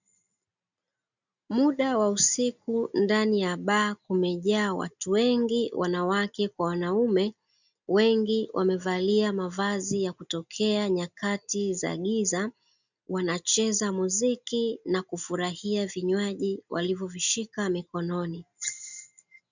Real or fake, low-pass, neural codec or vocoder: real; 7.2 kHz; none